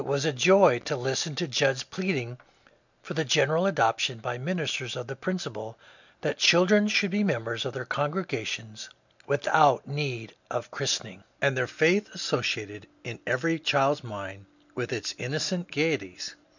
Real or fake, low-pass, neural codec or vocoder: real; 7.2 kHz; none